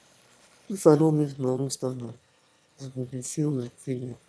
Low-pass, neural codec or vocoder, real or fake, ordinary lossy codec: none; autoencoder, 22.05 kHz, a latent of 192 numbers a frame, VITS, trained on one speaker; fake; none